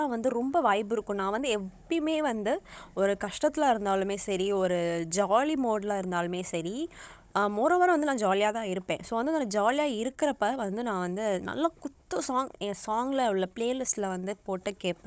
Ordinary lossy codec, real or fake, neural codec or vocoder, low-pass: none; fake; codec, 16 kHz, 16 kbps, FunCodec, trained on Chinese and English, 50 frames a second; none